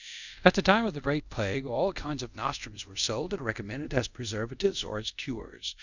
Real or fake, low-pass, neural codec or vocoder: fake; 7.2 kHz; codec, 24 kHz, 0.5 kbps, DualCodec